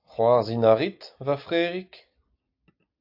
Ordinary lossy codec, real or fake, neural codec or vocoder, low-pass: AAC, 48 kbps; real; none; 5.4 kHz